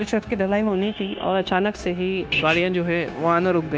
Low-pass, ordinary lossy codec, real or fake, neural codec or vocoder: none; none; fake; codec, 16 kHz, 0.9 kbps, LongCat-Audio-Codec